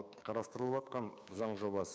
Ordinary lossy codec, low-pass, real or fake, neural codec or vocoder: none; none; fake; codec, 16 kHz, 6 kbps, DAC